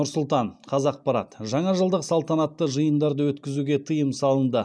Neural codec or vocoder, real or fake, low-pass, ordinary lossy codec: none; real; none; none